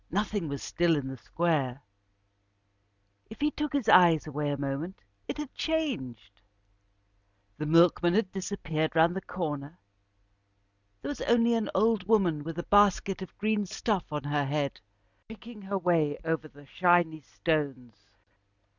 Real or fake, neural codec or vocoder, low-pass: real; none; 7.2 kHz